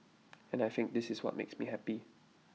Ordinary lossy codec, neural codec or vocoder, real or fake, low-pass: none; none; real; none